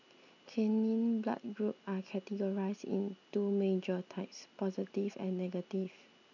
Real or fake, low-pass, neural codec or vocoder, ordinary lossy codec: real; 7.2 kHz; none; none